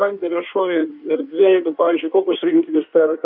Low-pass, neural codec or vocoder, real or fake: 5.4 kHz; codec, 16 kHz in and 24 kHz out, 1.1 kbps, FireRedTTS-2 codec; fake